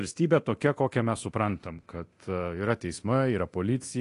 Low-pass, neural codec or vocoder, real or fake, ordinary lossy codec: 10.8 kHz; codec, 24 kHz, 0.9 kbps, DualCodec; fake; AAC, 48 kbps